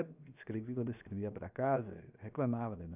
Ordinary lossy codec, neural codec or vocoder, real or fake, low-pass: none; codec, 16 kHz, 0.7 kbps, FocalCodec; fake; 3.6 kHz